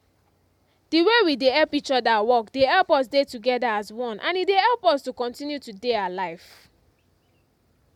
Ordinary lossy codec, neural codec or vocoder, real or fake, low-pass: MP3, 96 kbps; none; real; 19.8 kHz